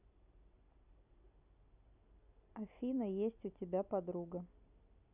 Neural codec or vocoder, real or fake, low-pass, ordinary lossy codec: none; real; 3.6 kHz; none